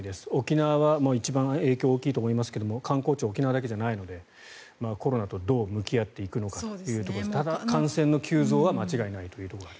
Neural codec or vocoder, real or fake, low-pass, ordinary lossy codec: none; real; none; none